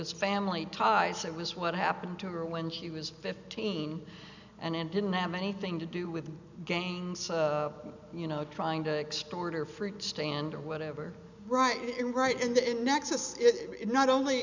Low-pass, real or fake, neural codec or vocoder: 7.2 kHz; real; none